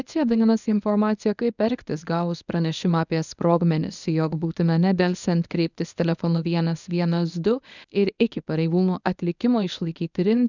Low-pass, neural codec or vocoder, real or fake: 7.2 kHz; codec, 24 kHz, 0.9 kbps, WavTokenizer, medium speech release version 1; fake